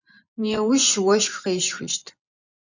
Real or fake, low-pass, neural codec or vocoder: fake; 7.2 kHz; vocoder, 24 kHz, 100 mel bands, Vocos